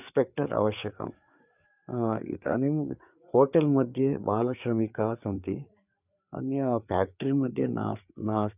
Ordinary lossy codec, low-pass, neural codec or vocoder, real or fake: none; 3.6 kHz; codec, 16 kHz, 4 kbps, FreqCodec, larger model; fake